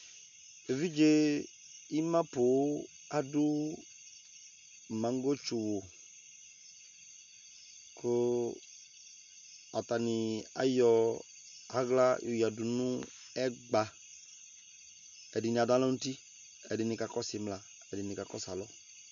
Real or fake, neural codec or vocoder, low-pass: real; none; 7.2 kHz